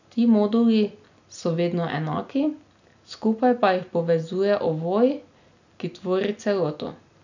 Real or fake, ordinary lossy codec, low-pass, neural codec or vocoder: real; none; 7.2 kHz; none